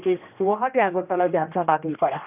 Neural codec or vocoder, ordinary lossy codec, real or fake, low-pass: codec, 16 kHz, 1 kbps, X-Codec, HuBERT features, trained on general audio; none; fake; 3.6 kHz